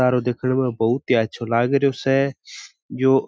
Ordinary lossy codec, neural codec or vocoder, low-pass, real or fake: none; none; none; real